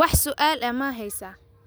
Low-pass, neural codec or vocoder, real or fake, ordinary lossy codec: none; none; real; none